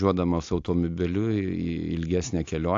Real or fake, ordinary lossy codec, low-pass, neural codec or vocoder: real; AAC, 48 kbps; 7.2 kHz; none